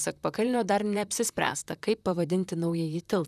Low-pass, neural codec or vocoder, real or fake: 14.4 kHz; vocoder, 44.1 kHz, 128 mel bands, Pupu-Vocoder; fake